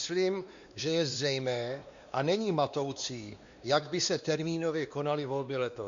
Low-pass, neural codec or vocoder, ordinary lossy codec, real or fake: 7.2 kHz; codec, 16 kHz, 2 kbps, X-Codec, WavLM features, trained on Multilingual LibriSpeech; Opus, 64 kbps; fake